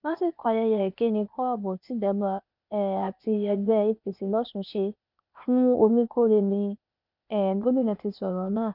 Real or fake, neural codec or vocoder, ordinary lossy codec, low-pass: fake; codec, 16 kHz, 0.8 kbps, ZipCodec; none; 5.4 kHz